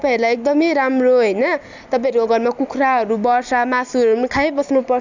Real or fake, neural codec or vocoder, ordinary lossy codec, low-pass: real; none; none; 7.2 kHz